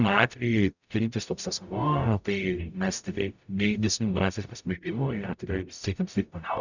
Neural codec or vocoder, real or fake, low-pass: codec, 44.1 kHz, 0.9 kbps, DAC; fake; 7.2 kHz